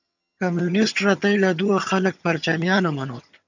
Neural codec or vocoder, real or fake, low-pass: vocoder, 22.05 kHz, 80 mel bands, HiFi-GAN; fake; 7.2 kHz